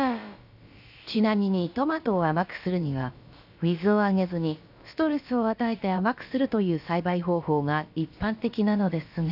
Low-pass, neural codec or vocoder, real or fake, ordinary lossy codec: 5.4 kHz; codec, 16 kHz, about 1 kbps, DyCAST, with the encoder's durations; fake; none